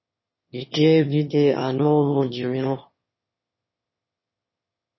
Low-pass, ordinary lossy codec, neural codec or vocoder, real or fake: 7.2 kHz; MP3, 24 kbps; autoencoder, 22.05 kHz, a latent of 192 numbers a frame, VITS, trained on one speaker; fake